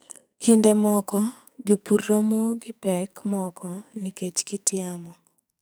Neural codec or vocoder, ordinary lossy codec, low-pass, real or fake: codec, 44.1 kHz, 2.6 kbps, SNAC; none; none; fake